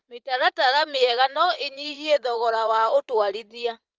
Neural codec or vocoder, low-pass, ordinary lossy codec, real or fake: vocoder, 44.1 kHz, 80 mel bands, Vocos; 7.2 kHz; Opus, 24 kbps; fake